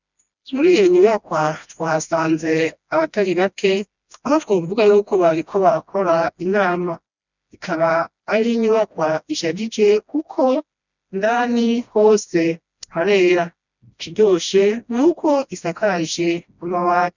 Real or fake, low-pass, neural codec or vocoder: fake; 7.2 kHz; codec, 16 kHz, 1 kbps, FreqCodec, smaller model